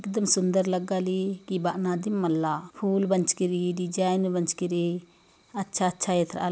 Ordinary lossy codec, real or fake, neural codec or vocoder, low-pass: none; real; none; none